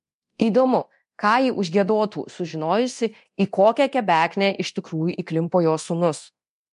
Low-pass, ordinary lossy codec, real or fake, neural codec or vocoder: 10.8 kHz; MP3, 64 kbps; fake; codec, 24 kHz, 0.9 kbps, DualCodec